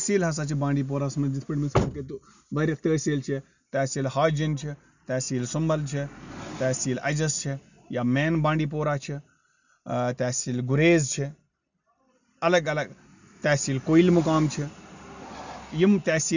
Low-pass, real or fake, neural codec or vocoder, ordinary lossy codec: 7.2 kHz; real; none; none